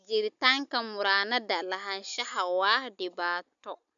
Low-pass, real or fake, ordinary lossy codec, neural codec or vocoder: 7.2 kHz; real; none; none